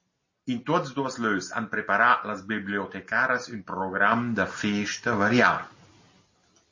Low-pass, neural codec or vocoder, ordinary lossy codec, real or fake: 7.2 kHz; none; MP3, 32 kbps; real